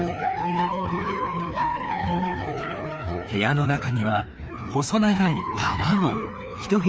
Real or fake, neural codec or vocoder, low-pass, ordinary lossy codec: fake; codec, 16 kHz, 2 kbps, FreqCodec, larger model; none; none